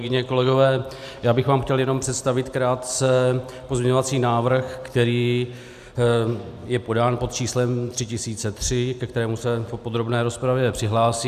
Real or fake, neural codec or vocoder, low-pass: real; none; 14.4 kHz